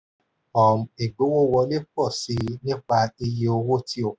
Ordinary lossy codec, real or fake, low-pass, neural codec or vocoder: none; real; none; none